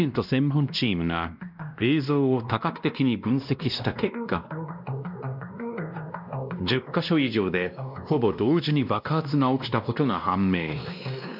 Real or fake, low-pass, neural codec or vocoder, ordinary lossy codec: fake; 5.4 kHz; codec, 16 kHz, 1 kbps, X-Codec, WavLM features, trained on Multilingual LibriSpeech; none